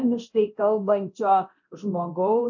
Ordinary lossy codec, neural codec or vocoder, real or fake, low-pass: AAC, 48 kbps; codec, 24 kHz, 0.9 kbps, DualCodec; fake; 7.2 kHz